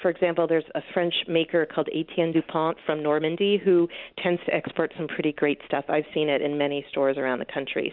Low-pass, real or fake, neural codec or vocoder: 5.4 kHz; real; none